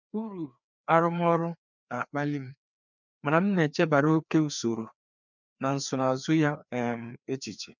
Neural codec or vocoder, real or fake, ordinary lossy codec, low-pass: codec, 16 kHz, 2 kbps, FreqCodec, larger model; fake; none; 7.2 kHz